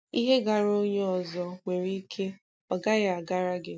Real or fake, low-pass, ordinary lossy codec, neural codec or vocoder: real; none; none; none